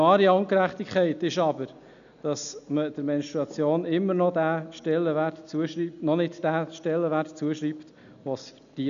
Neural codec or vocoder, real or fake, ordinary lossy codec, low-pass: none; real; none; 7.2 kHz